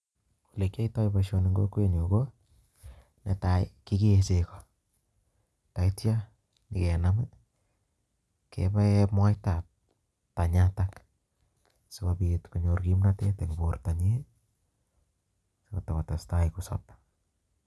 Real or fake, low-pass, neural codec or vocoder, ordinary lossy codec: real; none; none; none